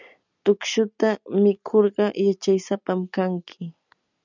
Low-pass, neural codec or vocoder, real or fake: 7.2 kHz; none; real